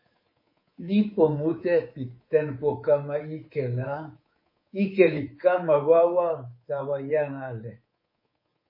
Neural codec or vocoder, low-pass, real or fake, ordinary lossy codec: codec, 24 kHz, 3.1 kbps, DualCodec; 5.4 kHz; fake; MP3, 24 kbps